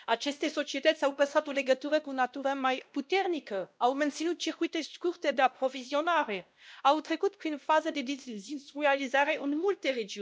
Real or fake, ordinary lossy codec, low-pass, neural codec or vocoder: fake; none; none; codec, 16 kHz, 1 kbps, X-Codec, WavLM features, trained on Multilingual LibriSpeech